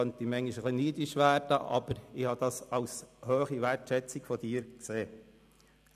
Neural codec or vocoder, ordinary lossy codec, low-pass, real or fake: none; none; 14.4 kHz; real